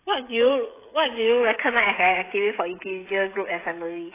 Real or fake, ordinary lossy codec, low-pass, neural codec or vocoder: fake; AAC, 16 kbps; 3.6 kHz; codec, 16 kHz, 16 kbps, FreqCodec, smaller model